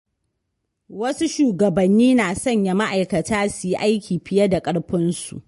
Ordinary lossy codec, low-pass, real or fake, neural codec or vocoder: MP3, 48 kbps; 10.8 kHz; real; none